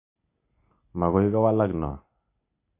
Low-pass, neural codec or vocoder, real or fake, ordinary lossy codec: 3.6 kHz; none; real; MP3, 24 kbps